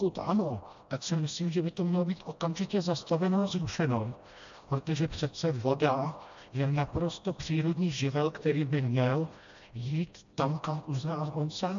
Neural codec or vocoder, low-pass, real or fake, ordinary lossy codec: codec, 16 kHz, 1 kbps, FreqCodec, smaller model; 7.2 kHz; fake; AAC, 64 kbps